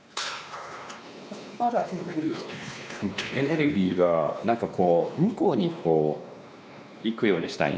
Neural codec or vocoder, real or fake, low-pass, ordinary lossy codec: codec, 16 kHz, 2 kbps, X-Codec, WavLM features, trained on Multilingual LibriSpeech; fake; none; none